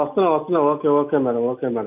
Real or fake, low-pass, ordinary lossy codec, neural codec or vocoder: real; 3.6 kHz; AAC, 32 kbps; none